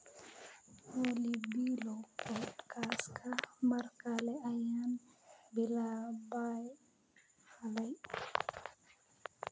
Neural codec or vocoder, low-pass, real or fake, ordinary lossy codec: none; none; real; none